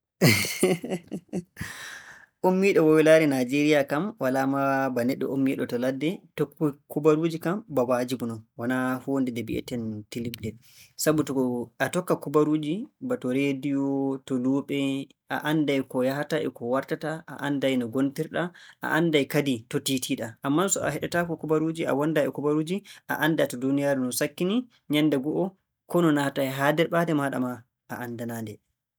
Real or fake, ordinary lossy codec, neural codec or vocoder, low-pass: real; none; none; none